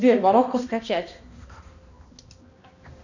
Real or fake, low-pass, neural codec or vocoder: fake; 7.2 kHz; codec, 16 kHz, 1 kbps, X-Codec, HuBERT features, trained on balanced general audio